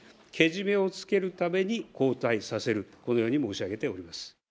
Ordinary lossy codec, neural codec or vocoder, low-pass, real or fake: none; none; none; real